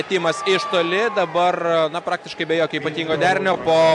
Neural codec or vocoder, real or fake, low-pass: none; real; 10.8 kHz